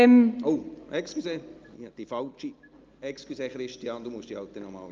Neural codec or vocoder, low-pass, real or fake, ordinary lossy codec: none; 7.2 kHz; real; Opus, 24 kbps